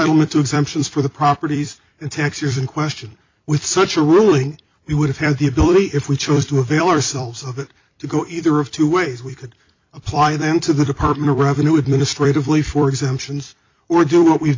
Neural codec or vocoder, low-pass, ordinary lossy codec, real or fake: vocoder, 44.1 kHz, 128 mel bands every 256 samples, BigVGAN v2; 7.2 kHz; MP3, 64 kbps; fake